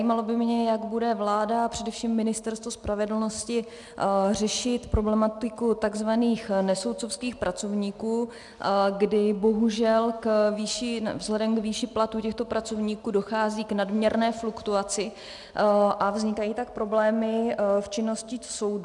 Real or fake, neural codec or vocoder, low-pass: real; none; 10.8 kHz